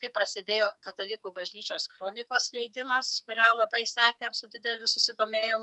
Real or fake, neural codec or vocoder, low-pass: fake; codec, 44.1 kHz, 2.6 kbps, SNAC; 10.8 kHz